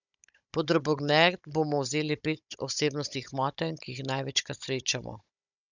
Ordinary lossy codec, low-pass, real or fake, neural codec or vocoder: none; 7.2 kHz; fake; codec, 16 kHz, 16 kbps, FunCodec, trained on Chinese and English, 50 frames a second